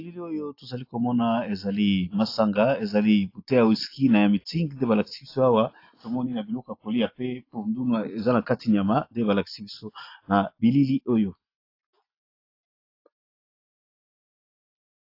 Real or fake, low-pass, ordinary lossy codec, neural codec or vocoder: real; 5.4 kHz; AAC, 32 kbps; none